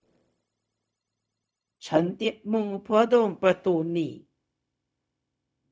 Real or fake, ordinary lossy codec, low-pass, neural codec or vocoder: fake; none; none; codec, 16 kHz, 0.4 kbps, LongCat-Audio-Codec